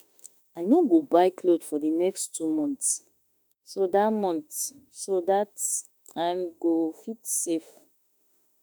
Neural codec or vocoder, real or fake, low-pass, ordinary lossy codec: autoencoder, 48 kHz, 32 numbers a frame, DAC-VAE, trained on Japanese speech; fake; none; none